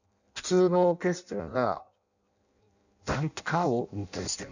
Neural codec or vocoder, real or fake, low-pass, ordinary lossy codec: codec, 16 kHz in and 24 kHz out, 0.6 kbps, FireRedTTS-2 codec; fake; 7.2 kHz; none